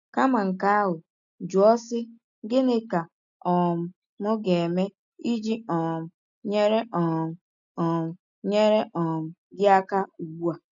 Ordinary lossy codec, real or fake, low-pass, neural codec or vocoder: none; real; 7.2 kHz; none